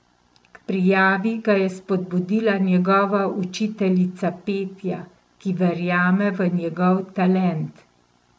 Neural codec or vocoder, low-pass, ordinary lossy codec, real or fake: none; none; none; real